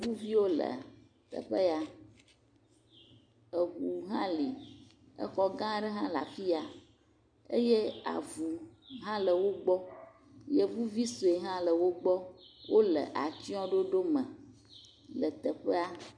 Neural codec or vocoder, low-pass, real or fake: none; 9.9 kHz; real